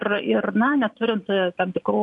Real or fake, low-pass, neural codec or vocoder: fake; 10.8 kHz; vocoder, 44.1 kHz, 128 mel bands every 512 samples, BigVGAN v2